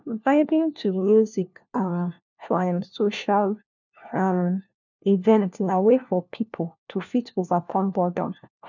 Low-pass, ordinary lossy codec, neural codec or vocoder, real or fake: 7.2 kHz; none; codec, 16 kHz, 1 kbps, FunCodec, trained on LibriTTS, 50 frames a second; fake